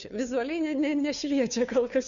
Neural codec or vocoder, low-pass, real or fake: codec, 16 kHz, 2 kbps, FunCodec, trained on Chinese and English, 25 frames a second; 7.2 kHz; fake